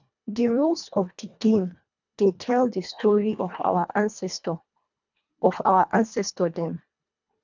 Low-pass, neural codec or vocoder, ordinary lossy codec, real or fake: 7.2 kHz; codec, 24 kHz, 1.5 kbps, HILCodec; none; fake